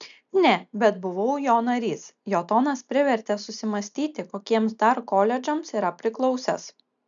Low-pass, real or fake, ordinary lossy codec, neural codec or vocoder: 7.2 kHz; real; MP3, 96 kbps; none